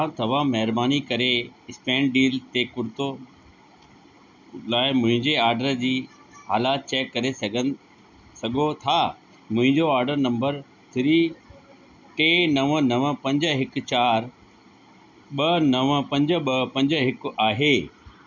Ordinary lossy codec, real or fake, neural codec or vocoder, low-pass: none; real; none; 7.2 kHz